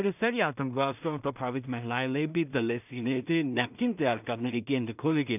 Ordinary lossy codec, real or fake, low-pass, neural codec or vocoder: none; fake; 3.6 kHz; codec, 16 kHz in and 24 kHz out, 0.4 kbps, LongCat-Audio-Codec, two codebook decoder